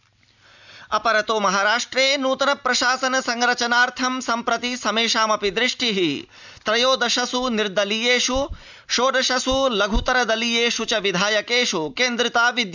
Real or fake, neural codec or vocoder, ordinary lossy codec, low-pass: real; none; none; 7.2 kHz